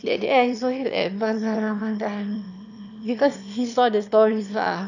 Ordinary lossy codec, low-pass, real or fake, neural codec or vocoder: none; 7.2 kHz; fake; autoencoder, 22.05 kHz, a latent of 192 numbers a frame, VITS, trained on one speaker